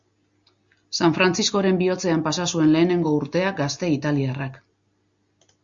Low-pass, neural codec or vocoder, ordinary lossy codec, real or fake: 7.2 kHz; none; Opus, 64 kbps; real